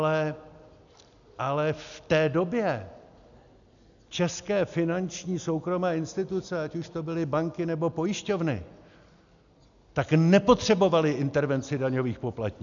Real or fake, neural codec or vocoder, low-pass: real; none; 7.2 kHz